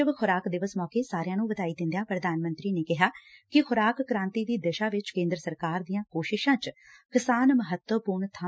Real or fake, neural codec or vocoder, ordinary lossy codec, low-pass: real; none; none; none